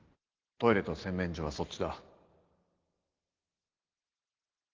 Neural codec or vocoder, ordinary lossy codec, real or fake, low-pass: none; Opus, 16 kbps; real; 7.2 kHz